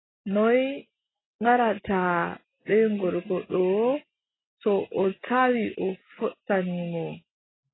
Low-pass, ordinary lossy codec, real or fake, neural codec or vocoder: 7.2 kHz; AAC, 16 kbps; real; none